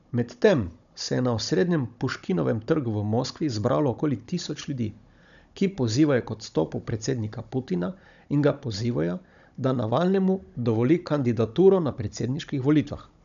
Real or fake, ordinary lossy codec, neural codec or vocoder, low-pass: fake; none; codec, 16 kHz, 16 kbps, FunCodec, trained on Chinese and English, 50 frames a second; 7.2 kHz